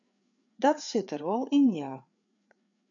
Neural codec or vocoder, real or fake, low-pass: codec, 16 kHz, 4 kbps, FreqCodec, larger model; fake; 7.2 kHz